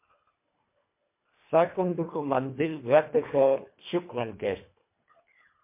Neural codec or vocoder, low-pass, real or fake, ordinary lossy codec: codec, 24 kHz, 1.5 kbps, HILCodec; 3.6 kHz; fake; MP3, 24 kbps